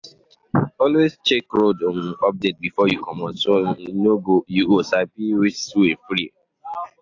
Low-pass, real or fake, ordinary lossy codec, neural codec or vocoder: 7.2 kHz; real; AAC, 48 kbps; none